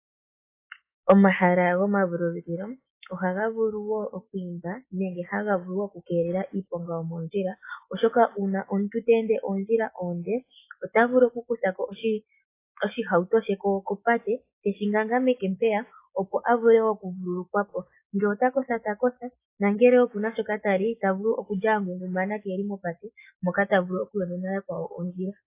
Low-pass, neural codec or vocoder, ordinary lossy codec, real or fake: 3.6 kHz; none; AAC, 24 kbps; real